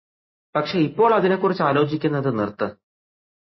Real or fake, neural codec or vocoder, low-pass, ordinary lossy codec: real; none; 7.2 kHz; MP3, 24 kbps